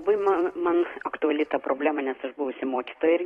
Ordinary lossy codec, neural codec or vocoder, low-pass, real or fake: AAC, 32 kbps; vocoder, 44.1 kHz, 128 mel bands every 256 samples, BigVGAN v2; 19.8 kHz; fake